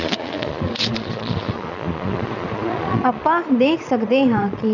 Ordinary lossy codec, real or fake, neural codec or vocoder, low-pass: none; fake; vocoder, 22.05 kHz, 80 mel bands, Vocos; 7.2 kHz